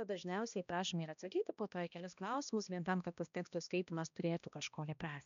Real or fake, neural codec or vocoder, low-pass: fake; codec, 16 kHz, 1 kbps, X-Codec, HuBERT features, trained on balanced general audio; 7.2 kHz